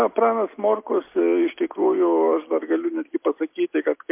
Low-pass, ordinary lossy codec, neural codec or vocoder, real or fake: 3.6 kHz; MP3, 32 kbps; none; real